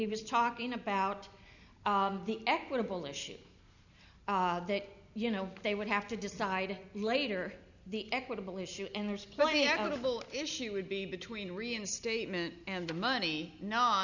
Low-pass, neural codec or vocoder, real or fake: 7.2 kHz; none; real